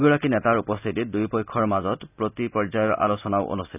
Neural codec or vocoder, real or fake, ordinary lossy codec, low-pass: none; real; none; 3.6 kHz